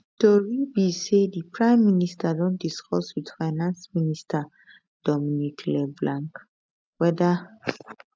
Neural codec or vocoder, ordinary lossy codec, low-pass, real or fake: none; none; none; real